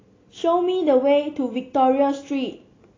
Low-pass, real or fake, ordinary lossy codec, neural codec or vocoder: 7.2 kHz; real; AAC, 32 kbps; none